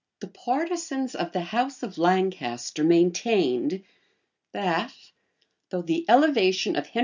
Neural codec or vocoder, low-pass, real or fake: none; 7.2 kHz; real